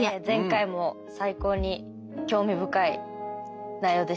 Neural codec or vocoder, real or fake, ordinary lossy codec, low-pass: none; real; none; none